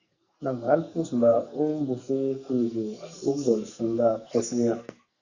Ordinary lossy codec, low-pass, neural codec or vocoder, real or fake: Opus, 64 kbps; 7.2 kHz; codec, 44.1 kHz, 2.6 kbps, SNAC; fake